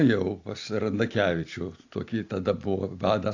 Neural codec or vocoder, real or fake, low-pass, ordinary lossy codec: none; real; 7.2 kHz; AAC, 48 kbps